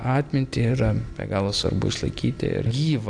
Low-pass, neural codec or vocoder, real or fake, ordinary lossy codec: 9.9 kHz; none; real; AAC, 64 kbps